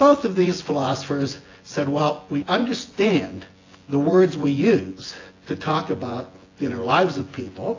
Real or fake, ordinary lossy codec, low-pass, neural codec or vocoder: fake; AAC, 32 kbps; 7.2 kHz; vocoder, 24 kHz, 100 mel bands, Vocos